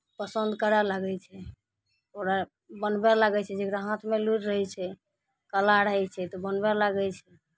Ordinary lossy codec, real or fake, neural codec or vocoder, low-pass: none; real; none; none